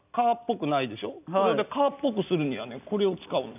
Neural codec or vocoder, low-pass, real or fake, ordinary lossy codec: none; 3.6 kHz; real; none